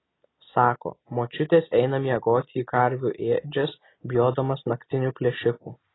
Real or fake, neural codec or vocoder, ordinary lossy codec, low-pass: real; none; AAC, 16 kbps; 7.2 kHz